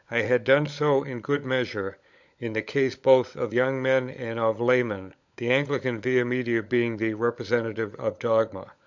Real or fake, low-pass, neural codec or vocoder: fake; 7.2 kHz; codec, 16 kHz, 16 kbps, FunCodec, trained on LibriTTS, 50 frames a second